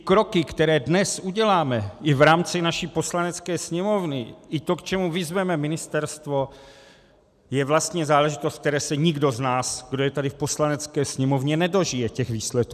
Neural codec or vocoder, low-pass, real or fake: none; 14.4 kHz; real